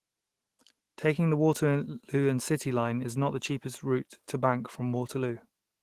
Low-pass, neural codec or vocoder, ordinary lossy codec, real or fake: 14.4 kHz; autoencoder, 48 kHz, 128 numbers a frame, DAC-VAE, trained on Japanese speech; Opus, 16 kbps; fake